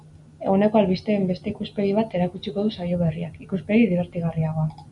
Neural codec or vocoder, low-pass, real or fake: none; 10.8 kHz; real